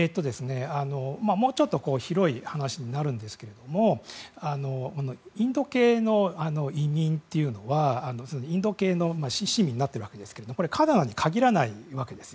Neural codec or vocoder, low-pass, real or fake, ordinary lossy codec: none; none; real; none